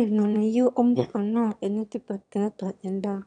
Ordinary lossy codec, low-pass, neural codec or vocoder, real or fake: none; 9.9 kHz; autoencoder, 22.05 kHz, a latent of 192 numbers a frame, VITS, trained on one speaker; fake